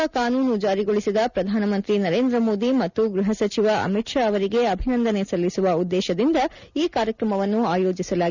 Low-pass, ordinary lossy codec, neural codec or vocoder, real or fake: 7.2 kHz; none; none; real